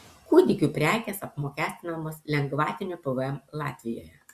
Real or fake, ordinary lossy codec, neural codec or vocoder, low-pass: real; Opus, 64 kbps; none; 14.4 kHz